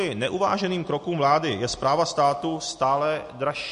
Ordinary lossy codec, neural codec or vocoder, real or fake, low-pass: MP3, 48 kbps; none; real; 10.8 kHz